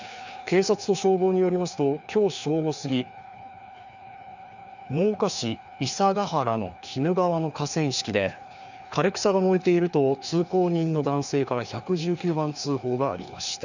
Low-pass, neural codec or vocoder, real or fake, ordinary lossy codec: 7.2 kHz; codec, 16 kHz, 2 kbps, FreqCodec, larger model; fake; none